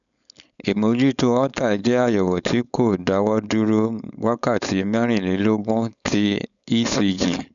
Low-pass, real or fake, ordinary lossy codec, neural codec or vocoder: 7.2 kHz; fake; none; codec, 16 kHz, 4.8 kbps, FACodec